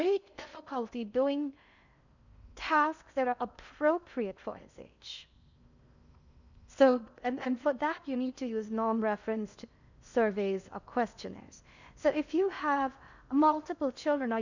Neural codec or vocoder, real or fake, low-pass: codec, 16 kHz in and 24 kHz out, 0.6 kbps, FocalCodec, streaming, 2048 codes; fake; 7.2 kHz